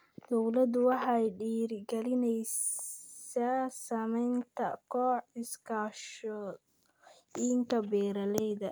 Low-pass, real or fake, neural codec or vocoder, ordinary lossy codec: none; real; none; none